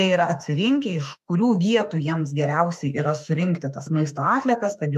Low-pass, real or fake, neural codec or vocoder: 14.4 kHz; fake; autoencoder, 48 kHz, 32 numbers a frame, DAC-VAE, trained on Japanese speech